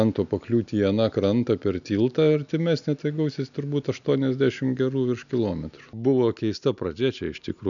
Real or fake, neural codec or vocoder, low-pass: real; none; 7.2 kHz